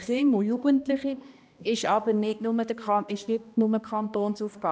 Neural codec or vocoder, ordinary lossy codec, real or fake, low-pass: codec, 16 kHz, 1 kbps, X-Codec, HuBERT features, trained on balanced general audio; none; fake; none